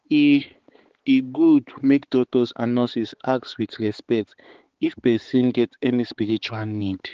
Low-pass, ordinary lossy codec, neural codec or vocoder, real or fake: 7.2 kHz; Opus, 16 kbps; codec, 16 kHz, 4 kbps, X-Codec, HuBERT features, trained on balanced general audio; fake